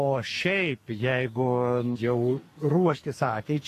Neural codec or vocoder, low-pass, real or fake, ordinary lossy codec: codec, 44.1 kHz, 2.6 kbps, SNAC; 14.4 kHz; fake; AAC, 48 kbps